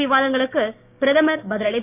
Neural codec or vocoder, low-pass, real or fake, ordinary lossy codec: codec, 16 kHz, 6 kbps, DAC; 3.6 kHz; fake; none